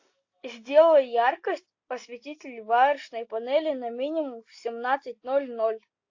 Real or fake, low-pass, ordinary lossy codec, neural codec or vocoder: real; 7.2 kHz; AAC, 48 kbps; none